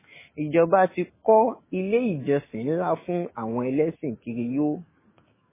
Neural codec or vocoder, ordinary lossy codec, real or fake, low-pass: autoencoder, 48 kHz, 128 numbers a frame, DAC-VAE, trained on Japanese speech; MP3, 16 kbps; fake; 3.6 kHz